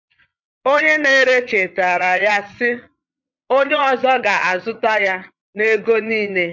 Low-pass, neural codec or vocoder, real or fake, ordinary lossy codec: 7.2 kHz; vocoder, 22.05 kHz, 80 mel bands, Vocos; fake; MP3, 48 kbps